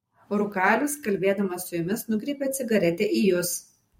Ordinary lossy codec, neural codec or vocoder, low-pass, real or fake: MP3, 64 kbps; vocoder, 44.1 kHz, 128 mel bands every 512 samples, BigVGAN v2; 19.8 kHz; fake